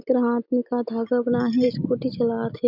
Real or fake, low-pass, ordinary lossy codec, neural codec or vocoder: real; 5.4 kHz; none; none